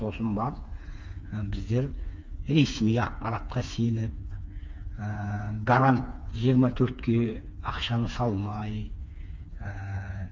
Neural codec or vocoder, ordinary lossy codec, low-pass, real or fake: codec, 16 kHz, 4 kbps, FreqCodec, smaller model; none; none; fake